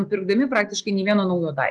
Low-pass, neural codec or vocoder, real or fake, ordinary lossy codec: 10.8 kHz; none; real; Opus, 24 kbps